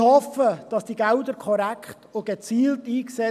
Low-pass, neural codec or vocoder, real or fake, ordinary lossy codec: 14.4 kHz; none; real; none